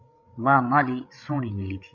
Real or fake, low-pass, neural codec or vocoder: fake; 7.2 kHz; codec, 16 kHz, 16 kbps, FreqCodec, larger model